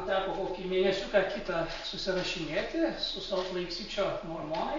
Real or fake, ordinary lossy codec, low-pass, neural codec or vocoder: real; MP3, 48 kbps; 7.2 kHz; none